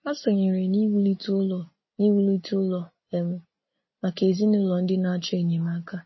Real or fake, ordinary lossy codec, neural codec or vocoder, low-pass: real; MP3, 24 kbps; none; 7.2 kHz